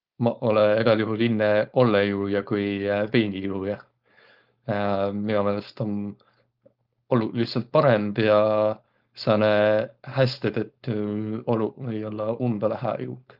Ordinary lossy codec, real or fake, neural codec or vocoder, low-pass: Opus, 24 kbps; fake; codec, 16 kHz, 4.8 kbps, FACodec; 5.4 kHz